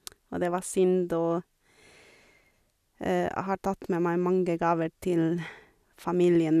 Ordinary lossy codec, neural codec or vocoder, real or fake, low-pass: none; none; real; 14.4 kHz